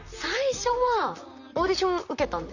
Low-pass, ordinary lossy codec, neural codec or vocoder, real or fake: 7.2 kHz; none; vocoder, 44.1 kHz, 128 mel bands, Pupu-Vocoder; fake